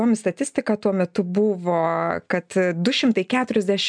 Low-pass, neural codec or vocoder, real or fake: 9.9 kHz; none; real